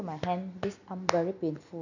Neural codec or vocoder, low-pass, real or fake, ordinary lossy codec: none; 7.2 kHz; real; none